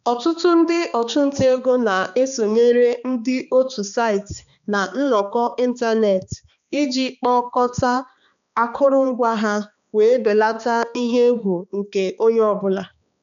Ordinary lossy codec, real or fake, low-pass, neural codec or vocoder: none; fake; 7.2 kHz; codec, 16 kHz, 2 kbps, X-Codec, HuBERT features, trained on balanced general audio